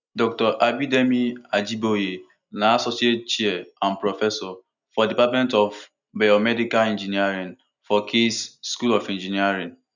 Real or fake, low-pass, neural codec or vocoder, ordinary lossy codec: real; 7.2 kHz; none; none